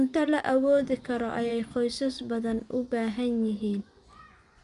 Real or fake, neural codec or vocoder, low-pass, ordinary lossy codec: fake; vocoder, 24 kHz, 100 mel bands, Vocos; 10.8 kHz; none